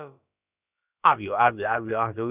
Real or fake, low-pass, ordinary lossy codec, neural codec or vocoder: fake; 3.6 kHz; none; codec, 16 kHz, about 1 kbps, DyCAST, with the encoder's durations